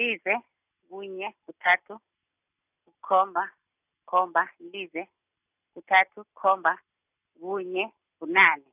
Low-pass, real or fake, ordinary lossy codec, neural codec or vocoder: 3.6 kHz; real; none; none